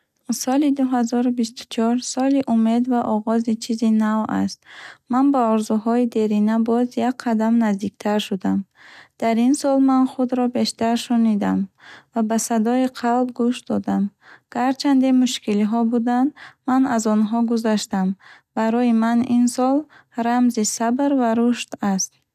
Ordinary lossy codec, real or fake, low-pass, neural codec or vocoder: none; real; 14.4 kHz; none